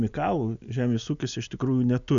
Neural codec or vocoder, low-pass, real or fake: none; 7.2 kHz; real